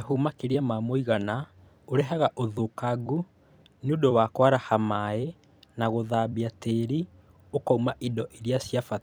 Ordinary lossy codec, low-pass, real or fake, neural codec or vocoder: none; none; fake; vocoder, 44.1 kHz, 128 mel bands every 256 samples, BigVGAN v2